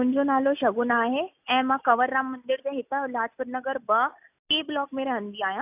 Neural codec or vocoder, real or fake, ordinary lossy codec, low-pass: none; real; AAC, 32 kbps; 3.6 kHz